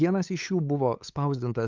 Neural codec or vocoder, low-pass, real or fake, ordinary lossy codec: codec, 16 kHz, 8 kbps, FunCodec, trained on LibriTTS, 25 frames a second; 7.2 kHz; fake; Opus, 32 kbps